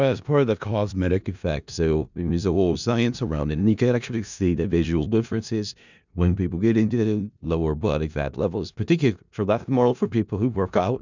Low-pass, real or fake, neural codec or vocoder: 7.2 kHz; fake; codec, 16 kHz in and 24 kHz out, 0.4 kbps, LongCat-Audio-Codec, four codebook decoder